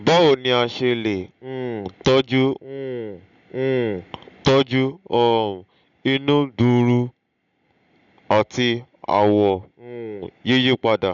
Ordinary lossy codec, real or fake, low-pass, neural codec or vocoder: none; real; 7.2 kHz; none